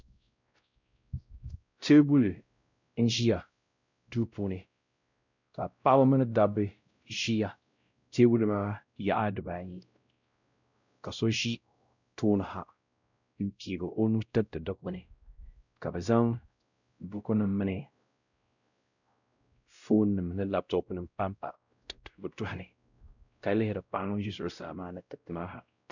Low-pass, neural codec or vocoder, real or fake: 7.2 kHz; codec, 16 kHz, 0.5 kbps, X-Codec, WavLM features, trained on Multilingual LibriSpeech; fake